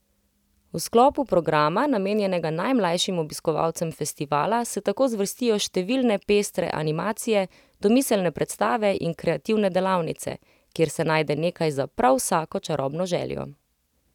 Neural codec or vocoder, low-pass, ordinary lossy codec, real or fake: none; 19.8 kHz; none; real